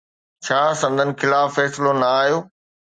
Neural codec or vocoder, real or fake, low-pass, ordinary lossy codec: none; real; 9.9 kHz; Opus, 64 kbps